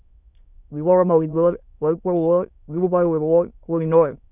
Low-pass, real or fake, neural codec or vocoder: 3.6 kHz; fake; autoencoder, 22.05 kHz, a latent of 192 numbers a frame, VITS, trained on many speakers